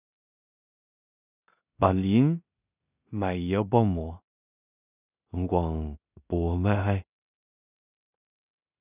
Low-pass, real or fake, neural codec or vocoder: 3.6 kHz; fake; codec, 16 kHz in and 24 kHz out, 0.4 kbps, LongCat-Audio-Codec, two codebook decoder